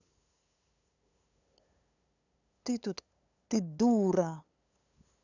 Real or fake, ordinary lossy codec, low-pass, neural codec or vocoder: fake; none; 7.2 kHz; codec, 16 kHz, 8 kbps, FunCodec, trained on LibriTTS, 25 frames a second